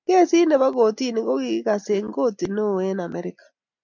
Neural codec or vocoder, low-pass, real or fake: none; 7.2 kHz; real